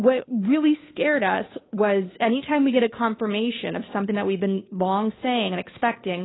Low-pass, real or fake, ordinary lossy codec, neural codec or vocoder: 7.2 kHz; fake; AAC, 16 kbps; codec, 16 kHz, 2 kbps, FunCodec, trained on Chinese and English, 25 frames a second